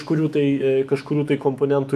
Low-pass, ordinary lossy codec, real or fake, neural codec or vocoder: 14.4 kHz; AAC, 96 kbps; fake; autoencoder, 48 kHz, 128 numbers a frame, DAC-VAE, trained on Japanese speech